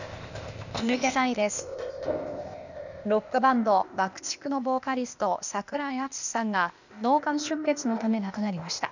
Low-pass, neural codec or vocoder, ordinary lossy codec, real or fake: 7.2 kHz; codec, 16 kHz, 0.8 kbps, ZipCodec; none; fake